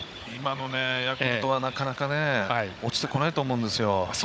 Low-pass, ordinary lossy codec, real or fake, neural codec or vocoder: none; none; fake; codec, 16 kHz, 16 kbps, FunCodec, trained on Chinese and English, 50 frames a second